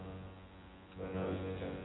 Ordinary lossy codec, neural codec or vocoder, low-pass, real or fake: AAC, 16 kbps; vocoder, 24 kHz, 100 mel bands, Vocos; 7.2 kHz; fake